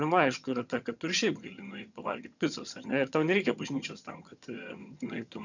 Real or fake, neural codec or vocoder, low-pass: fake; vocoder, 22.05 kHz, 80 mel bands, HiFi-GAN; 7.2 kHz